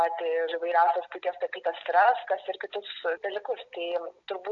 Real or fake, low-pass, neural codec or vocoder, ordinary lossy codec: real; 7.2 kHz; none; AAC, 48 kbps